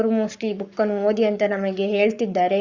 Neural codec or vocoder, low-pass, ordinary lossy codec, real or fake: codec, 44.1 kHz, 7.8 kbps, Pupu-Codec; 7.2 kHz; Opus, 64 kbps; fake